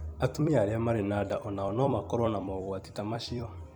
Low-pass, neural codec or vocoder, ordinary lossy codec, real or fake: 19.8 kHz; vocoder, 44.1 kHz, 128 mel bands every 256 samples, BigVGAN v2; none; fake